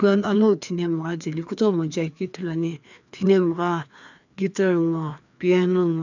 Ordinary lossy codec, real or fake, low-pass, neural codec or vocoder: none; fake; 7.2 kHz; codec, 16 kHz, 2 kbps, FreqCodec, larger model